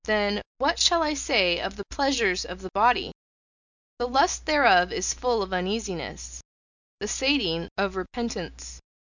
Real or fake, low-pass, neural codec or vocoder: real; 7.2 kHz; none